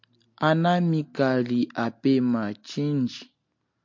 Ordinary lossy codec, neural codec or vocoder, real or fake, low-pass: MP3, 48 kbps; none; real; 7.2 kHz